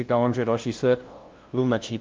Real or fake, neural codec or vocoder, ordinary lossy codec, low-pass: fake; codec, 16 kHz, 0.5 kbps, FunCodec, trained on LibriTTS, 25 frames a second; Opus, 32 kbps; 7.2 kHz